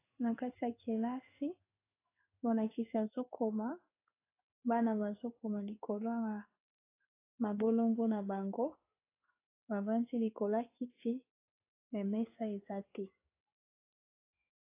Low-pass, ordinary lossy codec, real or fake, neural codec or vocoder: 3.6 kHz; AAC, 24 kbps; fake; codec, 16 kHz in and 24 kHz out, 1 kbps, XY-Tokenizer